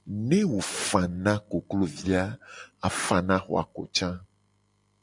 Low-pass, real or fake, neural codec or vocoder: 10.8 kHz; real; none